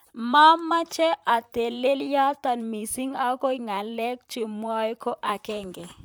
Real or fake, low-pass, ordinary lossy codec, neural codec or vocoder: fake; none; none; vocoder, 44.1 kHz, 128 mel bands, Pupu-Vocoder